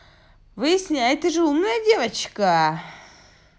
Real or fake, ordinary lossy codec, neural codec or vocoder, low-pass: real; none; none; none